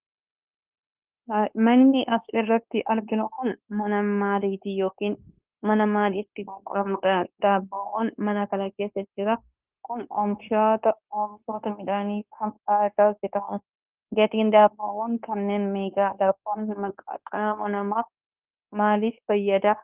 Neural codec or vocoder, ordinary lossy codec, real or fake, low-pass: codec, 16 kHz, 0.9 kbps, LongCat-Audio-Codec; Opus, 32 kbps; fake; 3.6 kHz